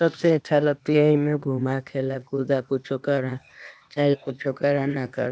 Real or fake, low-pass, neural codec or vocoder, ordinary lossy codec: fake; none; codec, 16 kHz, 0.8 kbps, ZipCodec; none